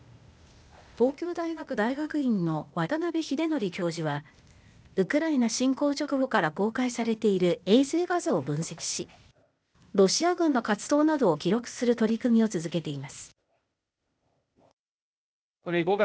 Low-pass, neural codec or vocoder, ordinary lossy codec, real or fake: none; codec, 16 kHz, 0.8 kbps, ZipCodec; none; fake